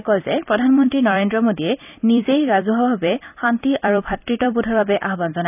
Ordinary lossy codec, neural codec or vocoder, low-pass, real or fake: none; vocoder, 44.1 kHz, 128 mel bands every 256 samples, BigVGAN v2; 3.6 kHz; fake